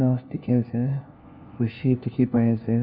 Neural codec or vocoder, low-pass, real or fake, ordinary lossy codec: codec, 16 kHz, 1 kbps, FunCodec, trained on LibriTTS, 50 frames a second; 5.4 kHz; fake; none